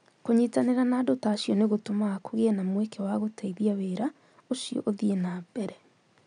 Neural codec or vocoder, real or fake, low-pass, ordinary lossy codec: none; real; 9.9 kHz; none